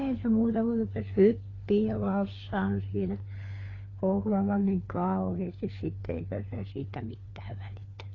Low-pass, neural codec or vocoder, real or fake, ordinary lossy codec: 7.2 kHz; codec, 16 kHz, 4 kbps, FunCodec, trained on LibriTTS, 50 frames a second; fake; AAC, 32 kbps